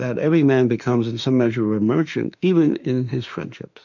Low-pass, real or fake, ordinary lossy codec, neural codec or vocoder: 7.2 kHz; fake; MP3, 64 kbps; autoencoder, 48 kHz, 32 numbers a frame, DAC-VAE, trained on Japanese speech